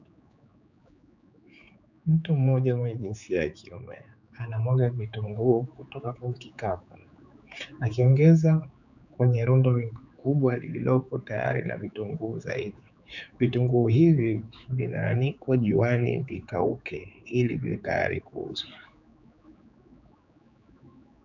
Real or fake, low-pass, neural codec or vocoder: fake; 7.2 kHz; codec, 16 kHz, 4 kbps, X-Codec, HuBERT features, trained on general audio